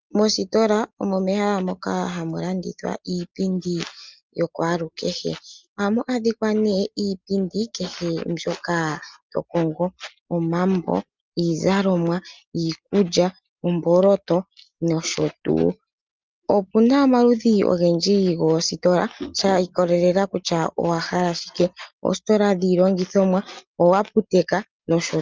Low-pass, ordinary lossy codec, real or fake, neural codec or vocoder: 7.2 kHz; Opus, 24 kbps; real; none